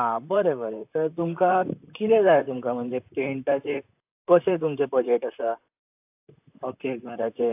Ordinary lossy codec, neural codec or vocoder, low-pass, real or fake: AAC, 32 kbps; vocoder, 44.1 kHz, 128 mel bands, Pupu-Vocoder; 3.6 kHz; fake